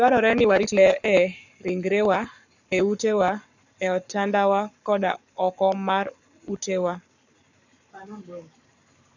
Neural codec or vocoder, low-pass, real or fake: codec, 44.1 kHz, 7.8 kbps, Pupu-Codec; 7.2 kHz; fake